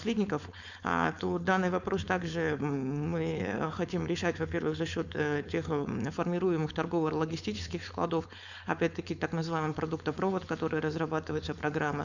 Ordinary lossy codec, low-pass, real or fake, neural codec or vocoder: none; 7.2 kHz; fake; codec, 16 kHz, 4.8 kbps, FACodec